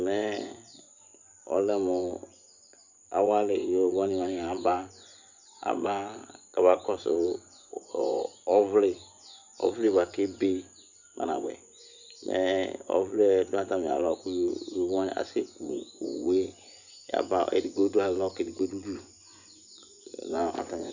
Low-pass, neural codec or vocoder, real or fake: 7.2 kHz; vocoder, 24 kHz, 100 mel bands, Vocos; fake